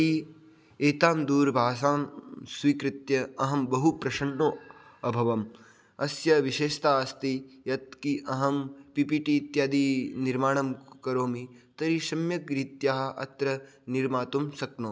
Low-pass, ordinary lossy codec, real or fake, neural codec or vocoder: none; none; real; none